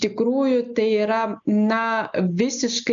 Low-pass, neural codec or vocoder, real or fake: 7.2 kHz; none; real